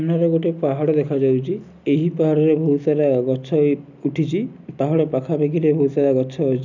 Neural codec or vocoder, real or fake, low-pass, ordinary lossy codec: none; real; 7.2 kHz; none